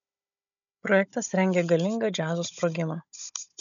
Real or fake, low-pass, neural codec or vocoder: fake; 7.2 kHz; codec, 16 kHz, 16 kbps, FunCodec, trained on Chinese and English, 50 frames a second